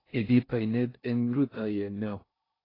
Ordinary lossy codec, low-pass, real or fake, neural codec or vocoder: AAC, 24 kbps; 5.4 kHz; fake; codec, 16 kHz in and 24 kHz out, 0.6 kbps, FocalCodec, streaming, 4096 codes